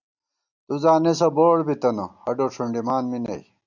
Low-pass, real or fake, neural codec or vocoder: 7.2 kHz; real; none